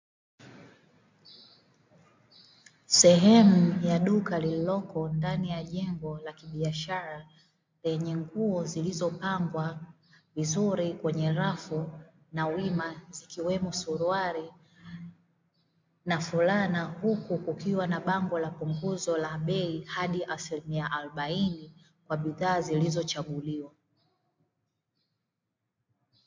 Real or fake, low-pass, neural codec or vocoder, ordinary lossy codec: real; 7.2 kHz; none; MP3, 64 kbps